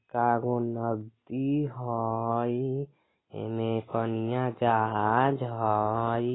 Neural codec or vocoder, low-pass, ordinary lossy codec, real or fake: none; 7.2 kHz; AAC, 16 kbps; real